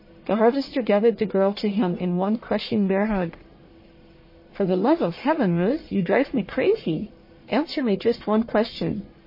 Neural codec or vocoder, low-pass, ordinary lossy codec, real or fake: codec, 44.1 kHz, 1.7 kbps, Pupu-Codec; 5.4 kHz; MP3, 24 kbps; fake